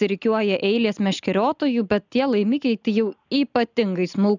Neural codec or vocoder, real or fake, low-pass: none; real; 7.2 kHz